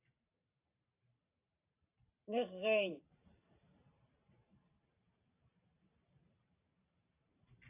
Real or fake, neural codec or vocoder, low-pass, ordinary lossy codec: fake; vocoder, 44.1 kHz, 128 mel bands every 512 samples, BigVGAN v2; 3.6 kHz; AAC, 32 kbps